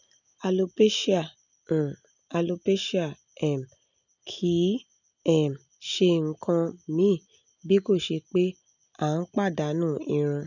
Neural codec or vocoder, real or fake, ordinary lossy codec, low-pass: none; real; none; 7.2 kHz